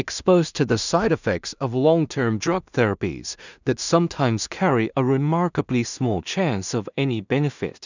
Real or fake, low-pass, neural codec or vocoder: fake; 7.2 kHz; codec, 16 kHz in and 24 kHz out, 0.4 kbps, LongCat-Audio-Codec, two codebook decoder